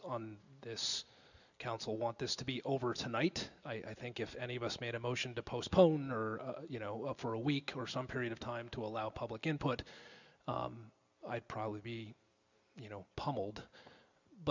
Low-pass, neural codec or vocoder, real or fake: 7.2 kHz; none; real